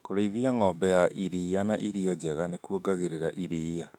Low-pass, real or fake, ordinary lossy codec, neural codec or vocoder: 19.8 kHz; fake; none; autoencoder, 48 kHz, 32 numbers a frame, DAC-VAE, trained on Japanese speech